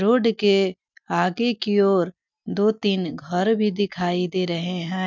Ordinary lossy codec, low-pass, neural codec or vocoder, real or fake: none; 7.2 kHz; none; real